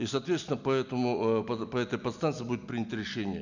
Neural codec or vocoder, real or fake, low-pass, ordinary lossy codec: none; real; 7.2 kHz; none